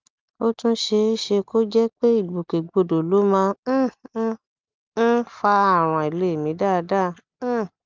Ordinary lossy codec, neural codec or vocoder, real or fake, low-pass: Opus, 24 kbps; none; real; 7.2 kHz